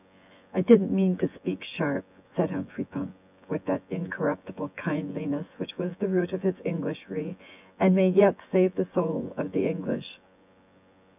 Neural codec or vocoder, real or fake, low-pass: vocoder, 24 kHz, 100 mel bands, Vocos; fake; 3.6 kHz